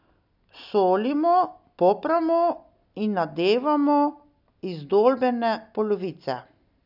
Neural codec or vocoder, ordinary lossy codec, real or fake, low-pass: none; none; real; 5.4 kHz